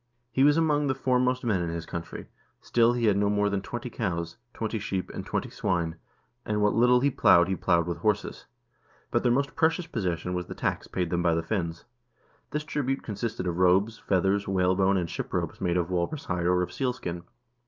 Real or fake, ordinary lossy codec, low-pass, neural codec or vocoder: real; Opus, 24 kbps; 7.2 kHz; none